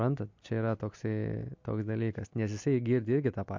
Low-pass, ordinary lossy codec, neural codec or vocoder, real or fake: 7.2 kHz; MP3, 48 kbps; none; real